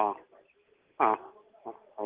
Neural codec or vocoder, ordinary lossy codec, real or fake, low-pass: none; Opus, 16 kbps; real; 3.6 kHz